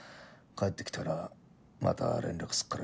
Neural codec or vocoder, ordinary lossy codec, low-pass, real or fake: none; none; none; real